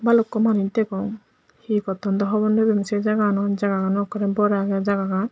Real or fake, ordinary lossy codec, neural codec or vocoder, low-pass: real; none; none; none